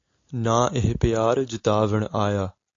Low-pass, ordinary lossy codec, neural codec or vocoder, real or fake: 7.2 kHz; AAC, 48 kbps; none; real